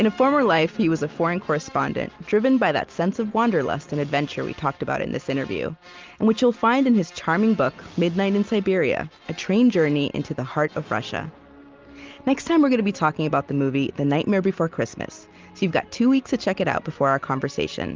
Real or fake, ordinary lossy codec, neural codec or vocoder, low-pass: real; Opus, 32 kbps; none; 7.2 kHz